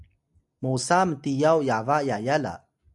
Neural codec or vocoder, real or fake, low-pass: none; real; 10.8 kHz